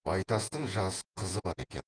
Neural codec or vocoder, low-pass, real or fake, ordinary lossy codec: vocoder, 48 kHz, 128 mel bands, Vocos; 9.9 kHz; fake; Opus, 24 kbps